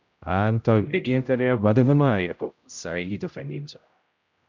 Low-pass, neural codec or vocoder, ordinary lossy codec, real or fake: 7.2 kHz; codec, 16 kHz, 0.5 kbps, X-Codec, HuBERT features, trained on general audio; MP3, 64 kbps; fake